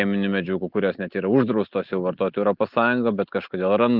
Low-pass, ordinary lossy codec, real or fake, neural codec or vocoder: 5.4 kHz; Opus, 32 kbps; real; none